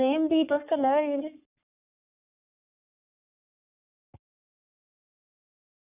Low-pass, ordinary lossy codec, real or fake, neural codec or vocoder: 3.6 kHz; none; fake; codec, 44.1 kHz, 3.4 kbps, Pupu-Codec